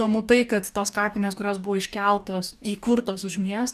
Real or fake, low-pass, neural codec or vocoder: fake; 14.4 kHz; codec, 44.1 kHz, 2.6 kbps, DAC